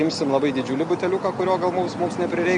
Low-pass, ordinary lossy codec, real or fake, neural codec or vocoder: 10.8 kHz; MP3, 96 kbps; real; none